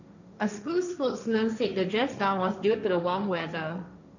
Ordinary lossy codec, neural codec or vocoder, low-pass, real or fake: none; codec, 16 kHz, 1.1 kbps, Voila-Tokenizer; 7.2 kHz; fake